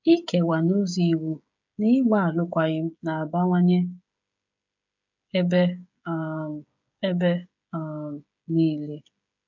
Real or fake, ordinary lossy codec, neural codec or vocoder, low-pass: fake; MP3, 64 kbps; codec, 16 kHz, 16 kbps, FreqCodec, smaller model; 7.2 kHz